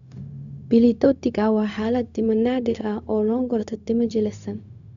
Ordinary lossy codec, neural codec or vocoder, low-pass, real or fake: none; codec, 16 kHz, 0.4 kbps, LongCat-Audio-Codec; 7.2 kHz; fake